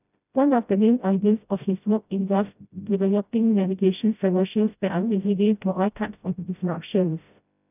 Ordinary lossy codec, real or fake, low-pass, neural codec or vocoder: none; fake; 3.6 kHz; codec, 16 kHz, 0.5 kbps, FreqCodec, smaller model